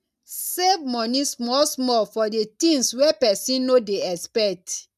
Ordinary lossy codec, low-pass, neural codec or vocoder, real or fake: none; 14.4 kHz; none; real